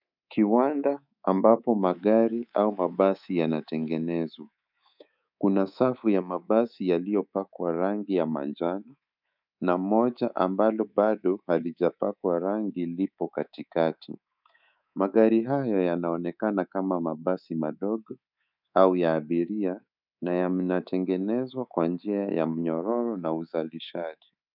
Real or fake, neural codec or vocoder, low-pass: fake; codec, 24 kHz, 3.1 kbps, DualCodec; 5.4 kHz